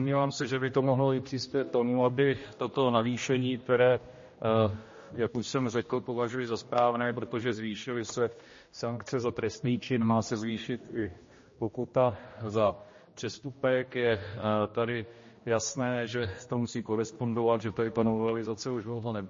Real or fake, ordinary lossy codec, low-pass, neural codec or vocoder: fake; MP3, 32 kbps; 7.2 kHz; codec, 16 kHz, 1 kbps, X-Codec, HuBERT features, trained on general audio